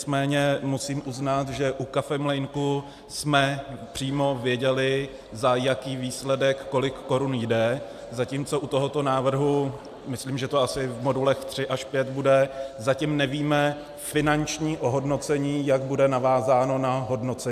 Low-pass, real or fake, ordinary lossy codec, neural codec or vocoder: 14.4 kHz; real; AAC, 96 kbps; none